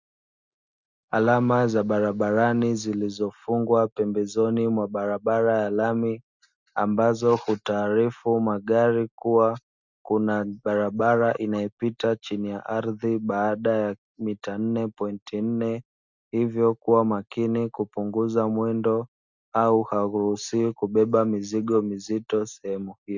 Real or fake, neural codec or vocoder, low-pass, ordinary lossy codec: real; none; 7.2 kHz; Opus, 64 kbps